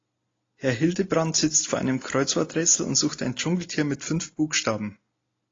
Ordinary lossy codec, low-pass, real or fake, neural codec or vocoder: AAC, 32 kbps; 7.2 kHz; real; none